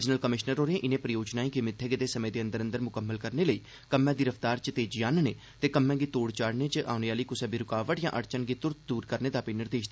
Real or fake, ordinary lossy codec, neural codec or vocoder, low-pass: real; none; none; none